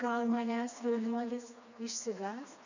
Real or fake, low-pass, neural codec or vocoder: fake; 7.2 kHz; codec, 16 kHz, 2 kbps, FreqCodec, smaller model